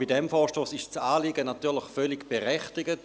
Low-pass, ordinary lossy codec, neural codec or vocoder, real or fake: none; none; none; real